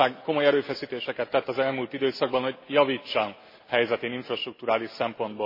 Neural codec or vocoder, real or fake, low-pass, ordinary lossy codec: none; real; 5.4 kHz; MP3, 24 kbps